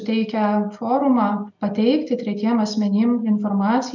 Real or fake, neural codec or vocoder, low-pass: real; none; 7.2 kHz